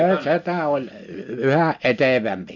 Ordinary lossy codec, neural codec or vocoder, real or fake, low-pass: none; none; real; 7.2 kHz